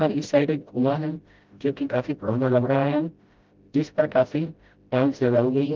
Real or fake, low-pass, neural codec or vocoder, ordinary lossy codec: fake; 7.2 kHz; codec, 16 kHz, 0.5 kbps, FreqCodec, smaller model; Opus, 32 kbps